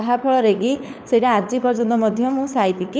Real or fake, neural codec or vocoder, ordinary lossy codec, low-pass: fake; codec, 16 kHz, 4 kbps, FunCodec, trained on LibriTTS, 50 frames a second; none; none